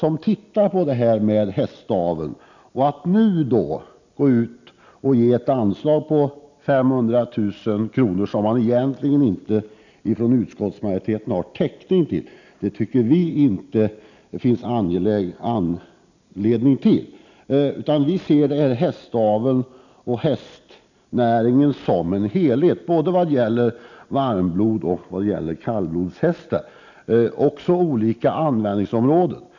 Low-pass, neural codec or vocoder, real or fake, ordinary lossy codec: 7.2 kHz; none; real; none